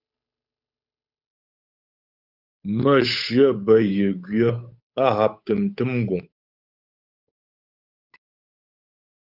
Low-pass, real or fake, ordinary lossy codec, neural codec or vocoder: 5.4 kHz; fake; Opus, 64 kbps; codec, 16 kHz, 8 kbps, FunCodec, trained on Chinese and English, 25 frames a second